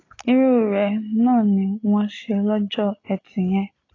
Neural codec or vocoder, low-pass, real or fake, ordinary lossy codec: none; 7.2 kHz; real; AAC, 32 kbps